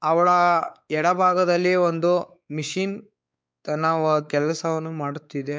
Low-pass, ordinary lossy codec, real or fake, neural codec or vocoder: none; none; fake; codec, 16 kHz, 4 kbps, X-Codec, WavLM features, trained on Multilingual LibriSpeech